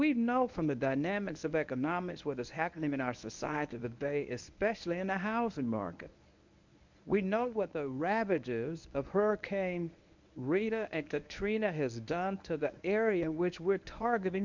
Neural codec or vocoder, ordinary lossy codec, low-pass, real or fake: codec, 24 kHz, 0.9 kbps, WavTokenizer, medium speech release version 1; MP3, 64 kbps; 7.2 kHz; fake